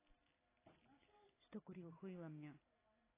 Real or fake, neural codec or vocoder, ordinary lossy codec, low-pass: real; none; AAC, 16 kbps; 3.6 kHz